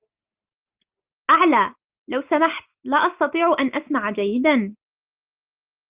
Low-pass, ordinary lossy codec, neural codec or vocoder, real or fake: 3.6 kHz; Opus, 24 kbps; none; real